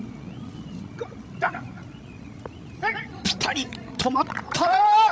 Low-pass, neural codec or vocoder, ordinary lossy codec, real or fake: none; codec, 16 kHz, 16 kbps, FreqCodec, larger model; none; fake